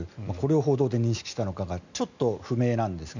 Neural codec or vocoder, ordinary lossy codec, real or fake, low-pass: none; none; real; 7.2 kHz